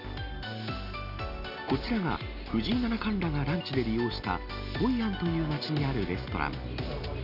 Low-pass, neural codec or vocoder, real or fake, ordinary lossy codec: 5.4 kHz; none; real; none